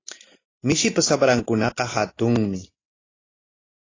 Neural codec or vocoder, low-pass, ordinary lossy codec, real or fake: none; 7.2 kHz; AAC, 32 kbps; real